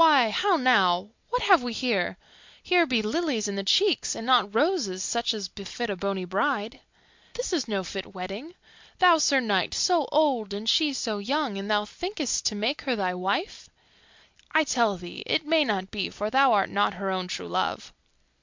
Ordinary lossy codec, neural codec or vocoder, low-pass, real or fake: MP3, 64 kbps; none; 7.2 kHz; real